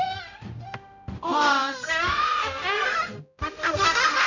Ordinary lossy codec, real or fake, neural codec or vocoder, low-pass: none; fake; codec, 16 kHz, 0.5 kbps, X-Codec, HuBERT features, trained on general audio; 7.2 kHz